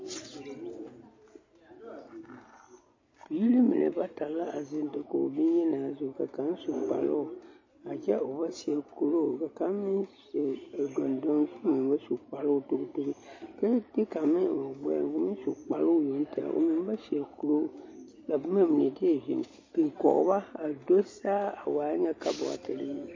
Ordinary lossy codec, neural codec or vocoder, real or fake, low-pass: MP3, 32 kbps; none; real; 7.2 kHz